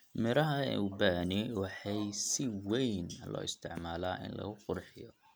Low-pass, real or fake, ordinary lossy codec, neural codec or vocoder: none; real; none; none